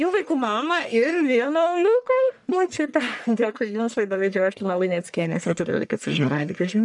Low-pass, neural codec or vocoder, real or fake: 10.8 kHz; codec, 44.1 kHz, 1.7 kbps, Pupu-Codec; fake